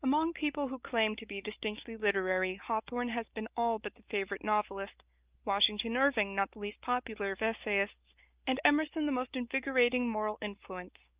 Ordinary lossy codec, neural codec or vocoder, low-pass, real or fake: Opus, 24 kbps; none; 3.6 kHz; real